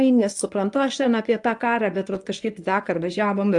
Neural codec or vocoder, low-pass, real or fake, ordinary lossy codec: codec, 24 kHz, 0.9 kbps, WavTokenizer, medium speech release version 1; 10.8 kHz; fake; AAC, 64 kbps